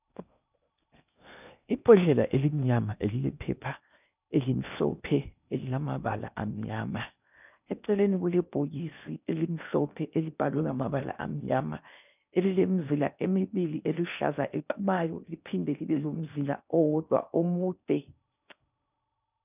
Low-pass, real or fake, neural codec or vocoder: 3.6 kHz; fake; codec, 16 kHz in and 24 kHz out, 0.8 kbps, FocalCodec, streaming, 65536 codes